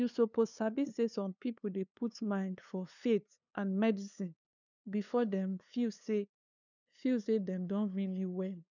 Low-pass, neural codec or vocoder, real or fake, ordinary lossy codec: 7.2 kHz; codec, 16 kHz, 2 kbps, FunCodec, trained on LibriTTS, 25 frames a second; fake; none